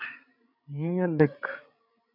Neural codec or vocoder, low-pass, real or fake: codec, 16 kHz, 16 kbps, FreqCodec, larger model; 5.4 kHz; fake